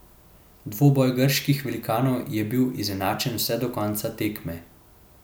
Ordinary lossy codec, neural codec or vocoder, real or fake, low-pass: none; none; real; none